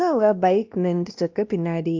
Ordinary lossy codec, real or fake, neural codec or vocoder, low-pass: Opus, 24 kbps; fake; codec, 16 kHz, 1 kbps, X-Codec, WavLM features, trained on Multilingual LibriSpeech; 7.2 kHz